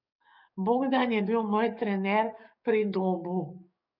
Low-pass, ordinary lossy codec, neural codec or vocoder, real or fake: 5.4 kHz; none; codec, 44.1 kHz, 7.8 kbps, DAC; fake